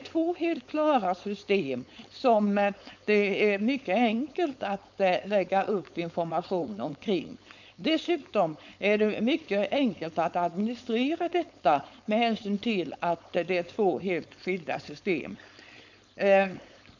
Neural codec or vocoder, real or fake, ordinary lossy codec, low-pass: codec, 16 kHz, 4.8 kbps, FACodec; fake; none; 7.2 kHz